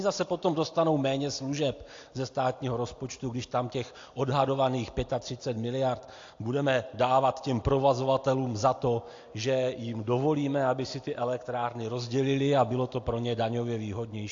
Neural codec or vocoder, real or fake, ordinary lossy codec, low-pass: none; real; AAC, 48 kbps; 7.2 kHz